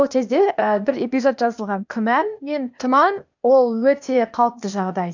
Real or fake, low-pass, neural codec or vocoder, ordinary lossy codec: fake; 7.2 kHz; codec, 16 kHz, 0.8 kbps, ZipCodec; none